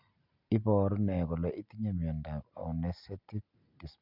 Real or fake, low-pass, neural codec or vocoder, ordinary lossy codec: real; 5.4 kHz; none; none